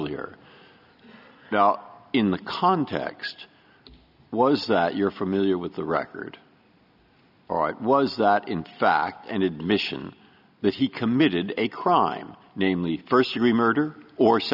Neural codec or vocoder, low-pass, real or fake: none; 5.4 kHz; real